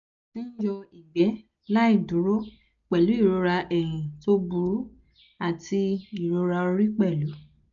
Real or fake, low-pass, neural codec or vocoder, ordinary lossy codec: real; 7.2 kHz; none; none